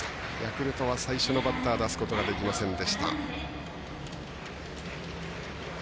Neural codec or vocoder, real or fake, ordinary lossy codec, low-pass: none; real; none; none